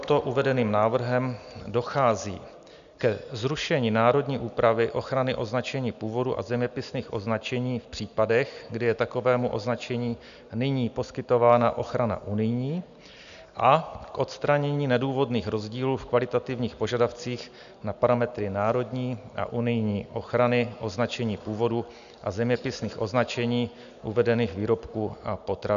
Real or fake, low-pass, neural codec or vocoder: real; 7.2 kHz; none